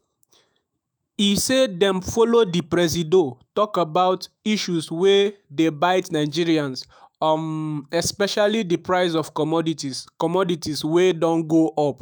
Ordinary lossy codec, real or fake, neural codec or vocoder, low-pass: none; fake; autoencoder, 48 kHz, 128 numbers a frame, DAC-VAE, trained on Japanese speech; none